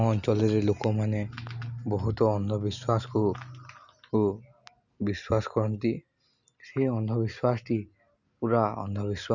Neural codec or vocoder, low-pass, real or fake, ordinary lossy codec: none; 7.2 kHz; real; none